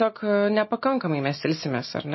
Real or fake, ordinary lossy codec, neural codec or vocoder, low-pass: real; MP3, 24 kbps; none; 7.2 kHz